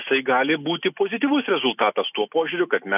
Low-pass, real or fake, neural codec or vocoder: 3.6 kHz; real; none